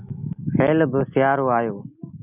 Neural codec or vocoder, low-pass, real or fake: none; 3.6 kHz; real